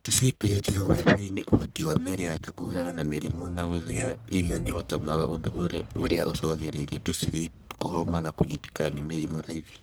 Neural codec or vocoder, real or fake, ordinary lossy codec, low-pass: codec, 44.1 kHz, 1.7 kbps, Pupu-Codec; fake; none; none